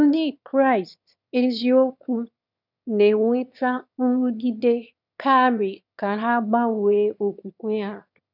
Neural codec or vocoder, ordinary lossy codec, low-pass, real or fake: autoencoder, 22.05 kHz, a latent of 192 numbers a frame, VITS, trained on one speaker; none; 5.4 kHz; fake